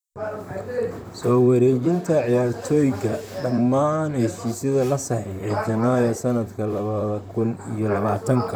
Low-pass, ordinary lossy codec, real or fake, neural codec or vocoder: none; none; fake; vocoder, 44.1 kHz, 128 mel bands, Pupu-Vocoder